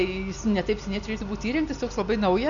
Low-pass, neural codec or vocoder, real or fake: 7.2 kHz; none; real